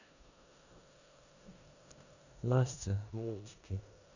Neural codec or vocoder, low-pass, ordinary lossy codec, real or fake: codec, 16 kHz in and 24 kHz out, 0.9 kbps, LongCat-Audio-Codec, four codebook decoder; 7.2 kHz; none; fake